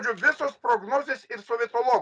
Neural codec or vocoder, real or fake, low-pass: none; real; 9.9 kHz